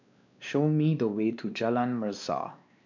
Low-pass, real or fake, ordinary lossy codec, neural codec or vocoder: 7.2 kHz; fake; none; codec, 16 kHz, 2 kbps, X-Codec, WavLM features, trained on Multilingual LibriSpeech